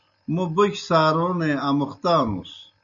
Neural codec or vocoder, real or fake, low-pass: none; real; 7.2 kHz